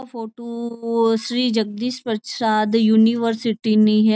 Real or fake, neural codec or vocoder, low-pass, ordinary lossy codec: real; none; none; none